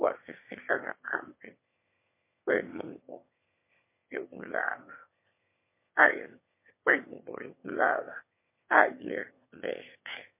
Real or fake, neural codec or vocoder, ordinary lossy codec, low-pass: fake; autoencoder, 22.05 kHz, a latent of 192 numbers a frame, VITS, trained on one speaker; MP3, 24 kbps; 3.6 kHz